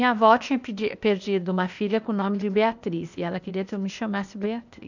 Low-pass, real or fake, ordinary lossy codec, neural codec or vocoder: 7.2 kHz; fake; none; codec, 16 kHz, 0.8 kbps, ZipCodec